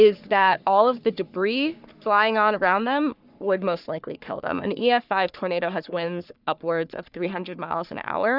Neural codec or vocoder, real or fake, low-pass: codec, 44.1 kHz, 3.4 kbps, Pupu-Codec; fake; 5.4 kHz